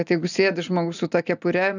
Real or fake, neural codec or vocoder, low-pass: real; none; 7.2 kHz